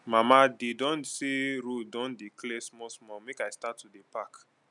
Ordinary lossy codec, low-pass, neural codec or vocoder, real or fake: none; 10.8 kHz; none; real